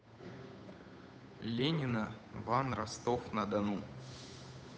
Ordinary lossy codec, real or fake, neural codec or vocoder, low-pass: none; fake; codec, 16 kHz, 8 kbps, FunCodec, trained on Chinese and English, 25 frames a second; none